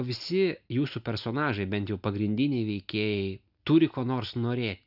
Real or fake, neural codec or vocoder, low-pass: real; none; 5.4 kHz